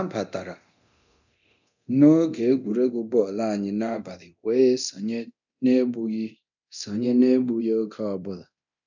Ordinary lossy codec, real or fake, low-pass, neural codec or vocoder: none; fake; 7.2 kHz; codec, 24 kHz, 0.9 kbps, DualCodec